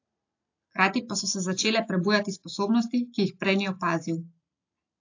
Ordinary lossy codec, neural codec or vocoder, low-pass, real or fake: AAC, 48 kbps; none; 7.2 kHz; real